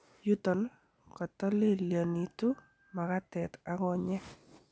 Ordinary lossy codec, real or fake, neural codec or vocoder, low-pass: none; real; none; none